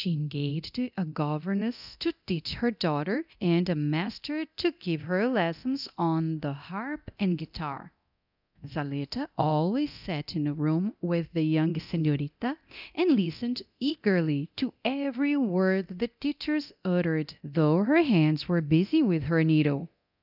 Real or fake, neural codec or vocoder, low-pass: fake; codec, 24 kHz, 0.9 kbps, DualCodec; 5.4 kHz